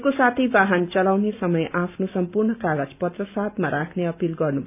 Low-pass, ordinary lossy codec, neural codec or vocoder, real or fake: 3.6 kHz; none; none; real